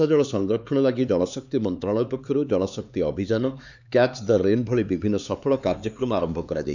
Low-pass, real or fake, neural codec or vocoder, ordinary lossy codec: 7.2 kHz; fake; codec, 16 kHz, 4 kbps, X-Codec, HuBERT features, trained on LibriSpeech; none